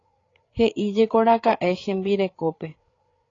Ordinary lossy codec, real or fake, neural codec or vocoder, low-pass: AAC, 32 kbps; fake; codec, 16 kHz, 8 kbps, FreqCodec, larger model; 7.2 kHz